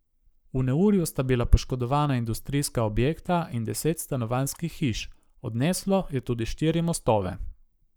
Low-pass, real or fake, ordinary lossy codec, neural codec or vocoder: none; fake; none; codec, 44.1 kHz, 7.8 kbps, Pupu-Codec